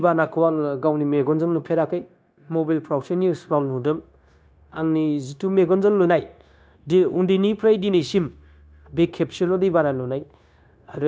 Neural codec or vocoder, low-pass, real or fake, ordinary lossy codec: codec, 16 kHz, 0.9 kbps, LongCat-Audio-Codec; none; fake; none